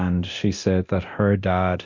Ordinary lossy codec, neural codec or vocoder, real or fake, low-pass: MP3, 48 kbps; codec, 24 kHz, 0.9 kbps, DualCodec; fake; 7.2 kHz